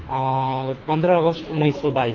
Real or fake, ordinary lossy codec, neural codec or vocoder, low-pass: fake; AAC, 32 kbps; codec, 24 kHz, 3 kbps, HILCodec; 7.2 kHz